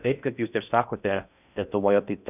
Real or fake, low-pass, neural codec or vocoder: fake; 3.6 kHz; codec, 16 kHz in and 24 kHz out, 0.6 kbps, FocalCodec, streaming, 2048 codes